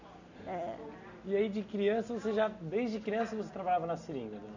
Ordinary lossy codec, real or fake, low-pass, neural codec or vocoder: none; real; 7.2 kHz; none